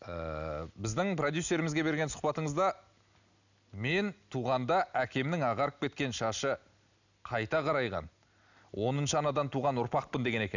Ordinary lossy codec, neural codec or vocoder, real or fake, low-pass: none; none; real; 7.2 kHz